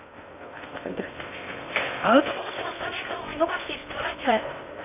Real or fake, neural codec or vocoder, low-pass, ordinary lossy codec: fake; codec, 16 kHz in and 24 kHz out, 0.6 kbps, FocalCodec, streaming, 2048 codes; 3.6 kHz; AAC, 32 kbps